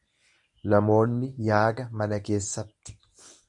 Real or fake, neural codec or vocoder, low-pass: fake; codec, 24 kHz, 0.9 kbps, WavTokenizer, medium speech release version 1; 10.8 kHz